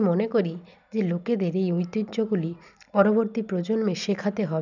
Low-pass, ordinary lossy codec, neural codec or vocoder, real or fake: 7.2 kHz; none; none; real